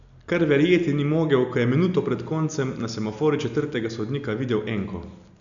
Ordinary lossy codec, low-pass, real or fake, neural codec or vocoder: none; 7.2 kHz; real; none